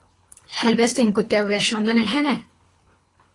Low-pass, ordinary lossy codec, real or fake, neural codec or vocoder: 10.8 kHz; AAC, 32 kbps; fake; codec, 24 kHz, 3 kbps, HILCodec